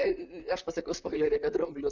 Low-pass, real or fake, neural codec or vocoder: 7.2 kHz; fake; vocoder, 44.1 kHz, 128 mel bands, Pupu-Vocoder